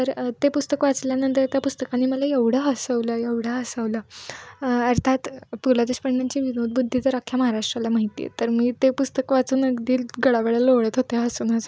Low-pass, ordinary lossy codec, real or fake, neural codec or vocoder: none; none; real; none